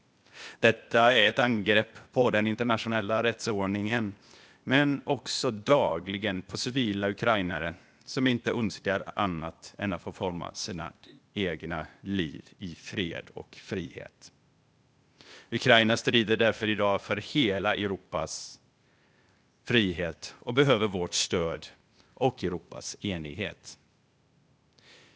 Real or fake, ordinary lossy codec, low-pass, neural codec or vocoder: fake; none; none; codec, 16 kHz, 0.8 kbps, ZipCodec